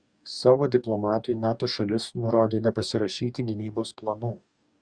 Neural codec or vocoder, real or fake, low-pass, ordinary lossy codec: codec, 44.1 kHz, 2.6 kbps, DAC; fake; 9.9 kHz; Opus, 64 kbps